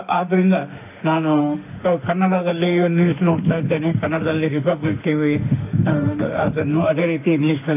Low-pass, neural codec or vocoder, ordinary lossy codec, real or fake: 3.6 kHz; autoencoder, 48 kHz, 32 numbers a frame, DAC-VAE, trained on Japanese speech; none; fake